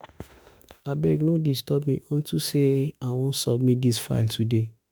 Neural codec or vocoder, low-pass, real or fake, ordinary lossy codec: autoencoder, 48 kHz, 32 numbers a frame, DAC-VAE, trained on Japanese speech; none; fake; none